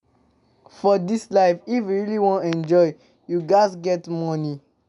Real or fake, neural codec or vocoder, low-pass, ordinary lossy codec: real; none; 10.8 kHz; none